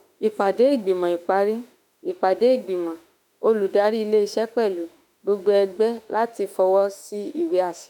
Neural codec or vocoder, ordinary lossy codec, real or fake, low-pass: autoencoder, 48 kHz, 32 numbers a frame, DAC-VAE, trained on Japanese speech; none; fake; 19.8 kHz